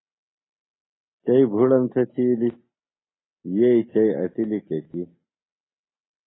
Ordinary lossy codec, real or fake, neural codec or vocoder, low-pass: AAC, 16 kbps; real; none; 7.2 kHz